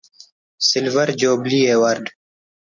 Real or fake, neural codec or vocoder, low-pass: real; none; 7.2 kHz